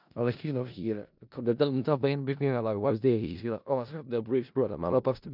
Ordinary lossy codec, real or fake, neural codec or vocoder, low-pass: none; fake; codec, 16 kHz in and 24 kHz out, 0.4 kbps, LongCat-Audio-Codec, four codebook decoder; 5.4 kHz